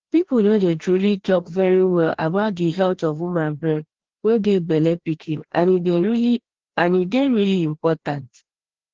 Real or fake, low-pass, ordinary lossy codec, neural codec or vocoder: fake; 7.2 kHz; Opus, 16 kbps; codec, 16 kHz, 1 kbps, FreqCodec, larger model